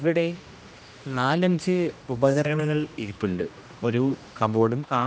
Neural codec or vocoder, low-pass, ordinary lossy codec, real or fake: codec, 16 kHz, 1 kbps, X-Codec, HuBERT features, trained on general audio; none; none; fake